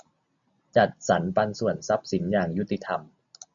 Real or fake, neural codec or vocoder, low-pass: real; none; 7.2 kHz